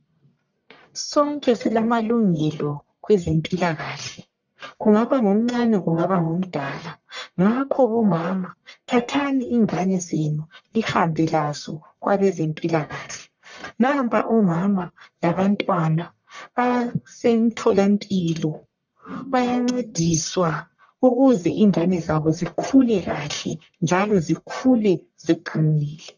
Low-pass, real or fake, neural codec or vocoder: 7.2 kHz; fake; codec, 44.1 kHz, 1.7 kbps, Pupu-Codec